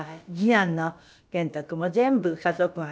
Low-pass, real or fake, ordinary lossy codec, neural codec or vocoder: none; fake; none; codec, 16 kHz, about 1 kbps, DyCAST, with the encoder's durations